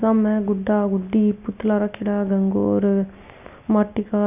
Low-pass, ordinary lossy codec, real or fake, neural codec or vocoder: 3.6 kHz; none; real; none